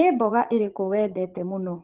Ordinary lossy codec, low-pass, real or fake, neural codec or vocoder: Opus, 16 kbps; 3.6 kHz; fake; vocoder, 44.1 kHz, 80 mel bands, Vocos